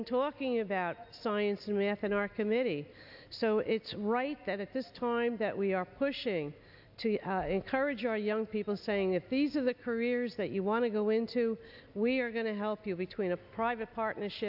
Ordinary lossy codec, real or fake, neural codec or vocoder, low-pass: AAC, 48 kbps; real; none; 5.4 kHz